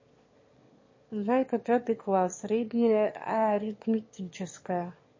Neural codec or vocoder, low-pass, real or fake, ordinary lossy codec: autoencoder, 22.05 kHz, a latent of 192 numbers a frame, VITS, trained on one speaker; 7.2 kHz; fake; MP3, 32 kbps